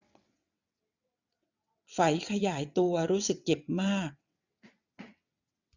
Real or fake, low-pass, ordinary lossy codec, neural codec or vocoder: fake; 7.2 kHz; none; vocoder, 22.05 kHz, 80 mel bands, WaveNeXt